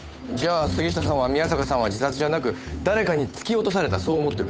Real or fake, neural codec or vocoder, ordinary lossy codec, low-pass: fake; codec, 16 kHz, 8 kbps, FunCodec, trained on Chinese and English, 25 frames a second; none; none